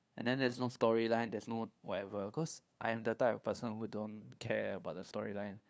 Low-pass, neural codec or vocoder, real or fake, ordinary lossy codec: none; codec, 16 kHz, 2 kbps, FunCodec, trained on LibriTTS, 25 frames a second; fake; none